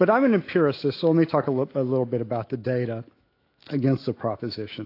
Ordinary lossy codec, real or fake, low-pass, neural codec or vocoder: AAC, 32 kbps; fake; 5.4 kHz; autoencoder, 48 kHz, 128 numbers a frame, DAC-VAE, trained on Japanese speech